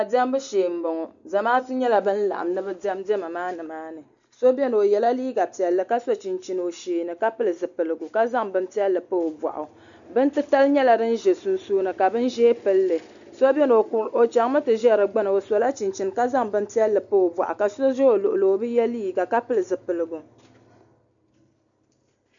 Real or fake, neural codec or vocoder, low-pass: real; none; 7.2 kHz